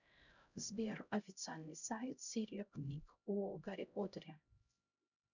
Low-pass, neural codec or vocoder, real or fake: 7.2 kHz; codec, 16 kHz, 0.5 kbps, X-Codec, HuBERT features, trained on LibriSpeech; fake